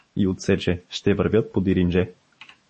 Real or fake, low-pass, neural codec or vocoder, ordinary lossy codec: fake; 10.8 kHz; autoencoder, 48 kHz, 128 numbers a frame, DAC-VAE, trained on Japanese speech; MP3, 32 kbps